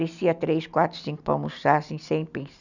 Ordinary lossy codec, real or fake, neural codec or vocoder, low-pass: none; real; none; 7.2 kHz